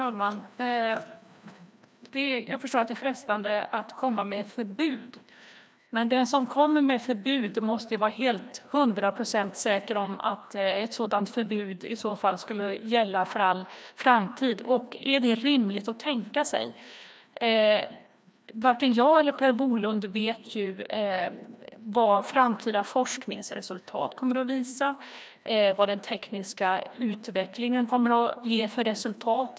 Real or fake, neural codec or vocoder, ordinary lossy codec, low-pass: fake; codec, 16 kHz, 1 kbps, FreqCodec, larger model; none; none